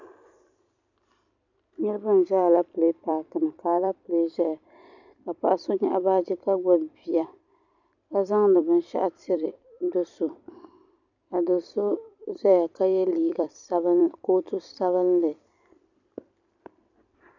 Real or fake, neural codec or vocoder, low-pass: fake; vocoder, 44.1 kHz, 128 mel bands every 256 samples, BigVGAN v2; 7.2 kHz